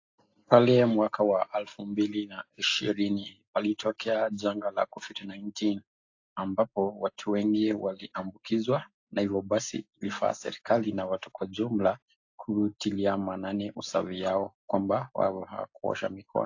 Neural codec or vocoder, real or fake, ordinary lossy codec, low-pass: none; real; AAC, 48 kbps; 7.2 kHz